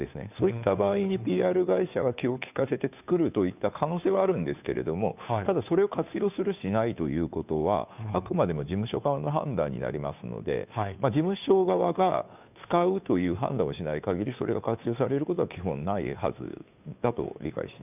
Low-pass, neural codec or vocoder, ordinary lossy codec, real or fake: 3.6 kHz; codec, 16 kHz, 2 kbps, FunCodec, trained on Chinese and English, 25 frames a second; none; fake